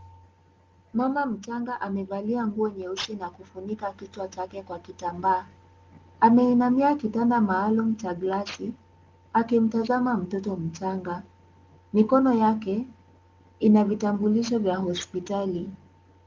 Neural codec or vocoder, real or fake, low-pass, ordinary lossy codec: none; real; 7.2 kHz; Opus, 32 kbps